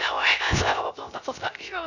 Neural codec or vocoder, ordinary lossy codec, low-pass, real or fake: codec, 16 kHz, 0.3 kbps, FocalCodec; none; 7.2 kHz; fake